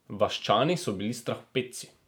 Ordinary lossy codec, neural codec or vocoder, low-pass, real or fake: none; none; none; real